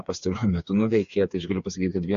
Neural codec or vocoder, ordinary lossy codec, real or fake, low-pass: codec, 16 kHz, 4 kbps, FreqCodec, smaller model; AAC, 48 kbps; fake; 7.2 kHz